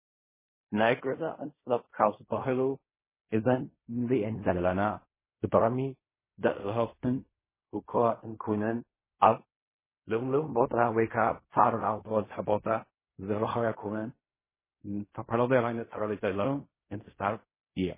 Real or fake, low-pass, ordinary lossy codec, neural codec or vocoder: fake; 3.6 kHz; MP3, 16 kbps; codec, 16 kHz in and 24 kHz out, 0.4 kbps, LongCat-Audio-Codec, fine tuned four codebook decoder